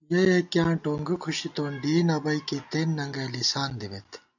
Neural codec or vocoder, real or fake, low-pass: none; real; 7.2 kHz